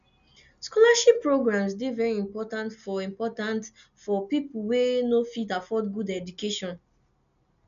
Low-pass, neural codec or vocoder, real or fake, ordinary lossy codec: 7.2 kHz; none; real; none